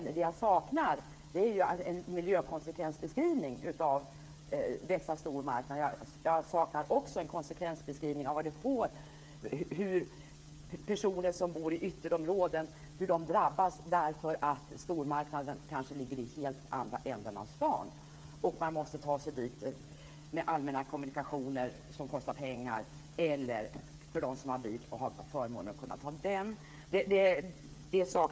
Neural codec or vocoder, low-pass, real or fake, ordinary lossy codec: codec, 16 kHz, 8 kbps, FreqCodec, smaller model; none; fake; none